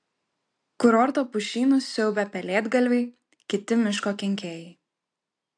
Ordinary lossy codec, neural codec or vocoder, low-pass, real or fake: AAC, 64 kbps; none; 9.9 kHz; real